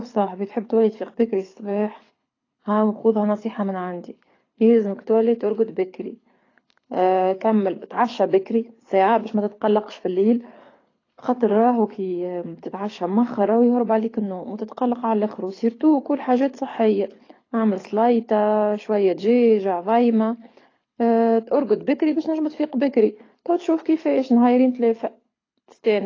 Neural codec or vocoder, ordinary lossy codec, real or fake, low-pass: codec, 24 kHz, 6 kbps, HILCodec; AAC, 32 kbps; fake; 7.2 kHz